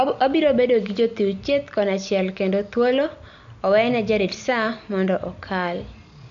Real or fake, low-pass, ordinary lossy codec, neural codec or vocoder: real; 7.2 kHz; AAC, 64 kbps; none